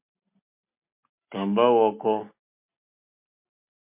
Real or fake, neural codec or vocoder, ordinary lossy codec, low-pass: real; none; MP3, 32 kbps; 3.6 kHz